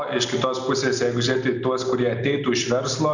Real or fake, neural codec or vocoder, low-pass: real; none; 7.2 kHz